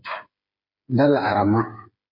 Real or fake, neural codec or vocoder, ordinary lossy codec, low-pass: fake; codec, 16 kHz, 8 kbps, FreqCodec, smaller model; MP3, 32 kbps; 5.4 kHz